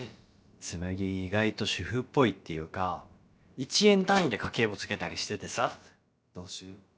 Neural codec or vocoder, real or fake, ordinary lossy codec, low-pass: codec, 16 kHz, about 1 kbps, DyCAST, with the encoder's durations; fake; none; none